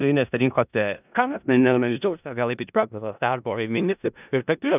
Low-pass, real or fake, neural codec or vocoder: 3.6 kHz; fake; codec, 16 kHz in and 24 kHz out, 0.4 kbps, LongCat-Audio-Codec, four codebook decoder